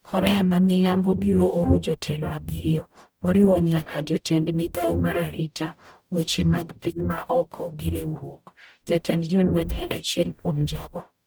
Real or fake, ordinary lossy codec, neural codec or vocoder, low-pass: fake; none; codec, 44.1 kHz, 0.9 kbps, DAC; none